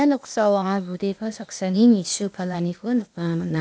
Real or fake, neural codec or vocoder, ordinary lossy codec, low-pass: fake; codec, 16 kHz, 0.8 kbps, ZipCodec; none; none